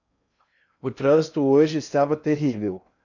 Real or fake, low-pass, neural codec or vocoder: fake; 7.2 kHz; codec, 16 kHz in and 24 kHz out, 0.6 kbps, FocalCodec, streaming, 4096 codes